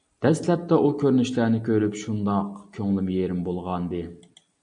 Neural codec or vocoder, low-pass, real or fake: none; 9.9 kHz; real